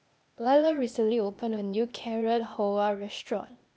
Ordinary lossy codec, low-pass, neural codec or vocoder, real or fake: none; none; codec, 16 kHz, 0.8 kbps, ZipCodec; fake